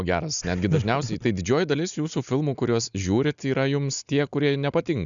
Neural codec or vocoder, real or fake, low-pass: none; real; 7.2 kHz